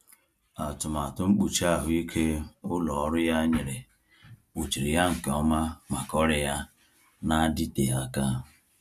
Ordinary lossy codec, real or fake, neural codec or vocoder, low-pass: MP3, 96 kbps; real; none; 14.4 kHz